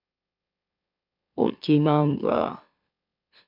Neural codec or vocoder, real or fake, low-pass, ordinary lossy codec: autoencoder, 44.1 kHz, a latent of 192 numbers a frame, MeloTTS; fake; 5.4 kHz; MP3, 48 kbps